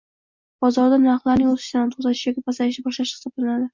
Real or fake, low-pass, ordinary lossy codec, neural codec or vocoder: real; 7.2 kHz; MP3, 64 kbps; none